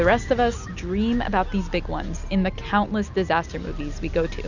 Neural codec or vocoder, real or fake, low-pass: none; real; 7.2 kHz